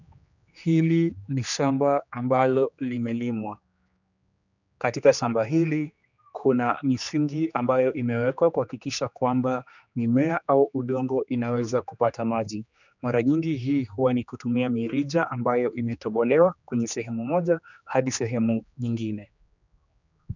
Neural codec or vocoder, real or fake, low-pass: codec, 16 kHz, 2 kbps, X-Codec, HuBERT features, trained on general audio; fake; 7.2 kHz